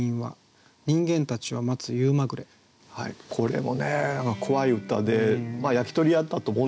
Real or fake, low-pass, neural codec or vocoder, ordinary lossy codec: real; none; none; none